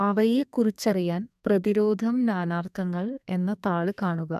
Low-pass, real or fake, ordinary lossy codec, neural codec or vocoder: 14.4 kHz; fake; none; codec, 32 kHz, 1.9 kbps, SNAC